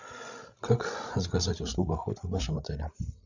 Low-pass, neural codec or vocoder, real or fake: 7.2 kHz; codec, 16 kHz, 16 kbps, FreqCodec, larger model; fake